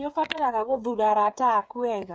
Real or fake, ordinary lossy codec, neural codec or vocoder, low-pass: fake; none; codec, 16 kHz, 8 kbps, FreqCodec, smaller model; none